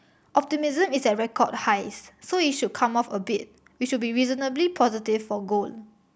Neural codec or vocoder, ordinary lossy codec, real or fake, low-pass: none; none; real; none